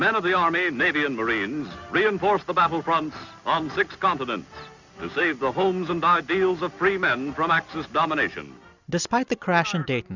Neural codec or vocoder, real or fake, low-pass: none; real; 7.2 kHz